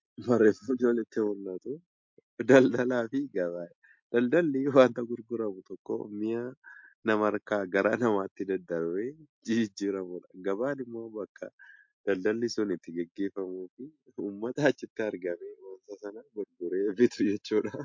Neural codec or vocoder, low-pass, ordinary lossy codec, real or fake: none; 7.2 kHz; MP3, 48 kbps; real